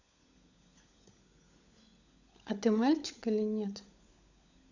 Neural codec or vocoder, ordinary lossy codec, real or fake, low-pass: codec, 16 kHz, 16 kbps, FunCodec, trained on LibriTTS, 50 frames a second; none; fake; 7.2 kHz